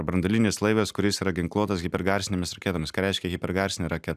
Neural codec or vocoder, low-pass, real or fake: none; 14.4 kHz; real